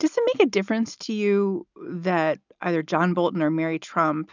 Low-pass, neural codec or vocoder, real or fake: 7.2 kHz; none; real